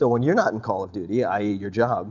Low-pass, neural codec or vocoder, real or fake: 7.2 kHz; none; real